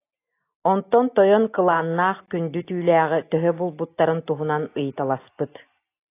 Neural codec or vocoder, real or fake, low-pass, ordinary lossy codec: none; real; 3.6 kHz; AAC, 24 kbps